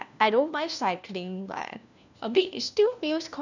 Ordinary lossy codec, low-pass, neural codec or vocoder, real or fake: none; 7.2 kHz; codec, 16 kHz, 1 kbps, FunCodec, trained on LibriTTS, 50 frames a second; fake